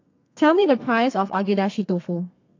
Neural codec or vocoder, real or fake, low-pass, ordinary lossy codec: codec, 32 kHz, 1.9 kbps, SNAC; fake; 7.2 kHz; AAC, 48 kbps